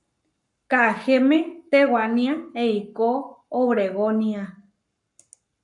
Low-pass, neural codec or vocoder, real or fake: 10.8 kHz; codec, 44.1 kHz, 7.8 kbps, Pupu-Codec; fake